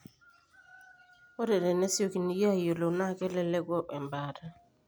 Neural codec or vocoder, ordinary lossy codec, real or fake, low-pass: none; none; real; none